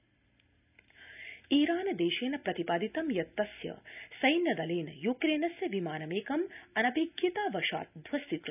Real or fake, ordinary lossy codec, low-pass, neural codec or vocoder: real; none; 3.6 kHz; none